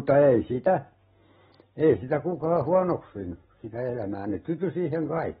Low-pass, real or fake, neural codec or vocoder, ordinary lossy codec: 7.2 kHz; real; none; AAC, 16 kbps